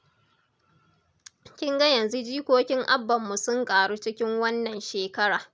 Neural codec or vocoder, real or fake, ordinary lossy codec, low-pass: none; real; none; none